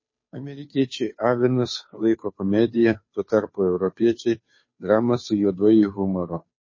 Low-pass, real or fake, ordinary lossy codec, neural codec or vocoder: 7.2 kHz; fake; MP3, 32 kbps; codec, 16 kHz, 2 kbps, FunCodec, trained on Chinese and English, 25 frames a second